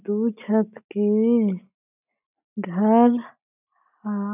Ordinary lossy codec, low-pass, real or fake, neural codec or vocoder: none; 3.6 kHz; real; none